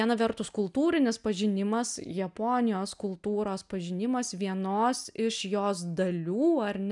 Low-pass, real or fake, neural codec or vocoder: 10.8 kHz; real; none